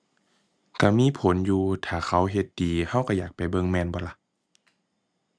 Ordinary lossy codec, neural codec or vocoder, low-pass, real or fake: none; none; none; real